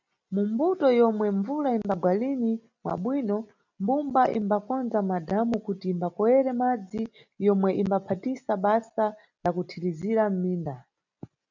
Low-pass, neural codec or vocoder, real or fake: 7.2 kHz; none; real